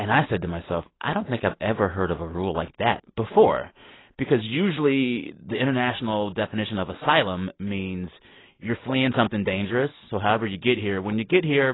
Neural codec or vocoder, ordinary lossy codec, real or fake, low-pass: none; AAC, 16 kbps; real; 7.2 kHz